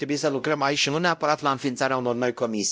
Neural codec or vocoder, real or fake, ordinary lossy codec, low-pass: codec, 16 kHz, 0.5 kbps, X-Codec, WavLM features, trained on Multilingual LibriSpeech; fake; none; none